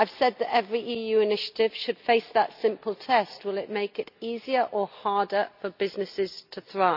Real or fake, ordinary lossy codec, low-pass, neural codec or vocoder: real; none; 5.4 kHz; none